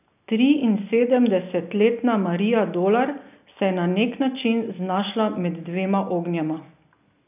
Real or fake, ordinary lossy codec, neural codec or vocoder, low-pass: real; none; none; 3.6 kHz